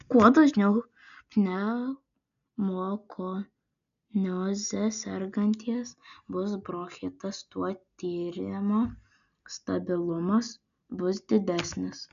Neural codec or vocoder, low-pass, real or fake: none; 7.2 kHz; real